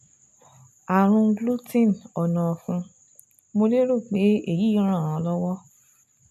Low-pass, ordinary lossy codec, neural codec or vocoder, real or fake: 14.4 kHz; none; none; real